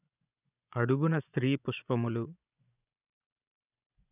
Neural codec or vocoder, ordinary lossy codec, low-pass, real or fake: vocoder, 24 kHz, 100 mel bands, Vocos; none; 3.6 kHz; fake